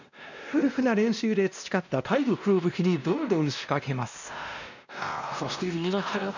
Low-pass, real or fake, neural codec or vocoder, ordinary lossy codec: 7.2 kHz; fake; codec, 16 kHz, 1 kbps, X-Codec, WavLM features, trained on Multilingual LibriSpeech; none